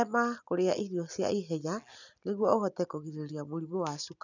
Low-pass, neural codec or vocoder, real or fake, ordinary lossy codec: 7.2 kHz; none; real; none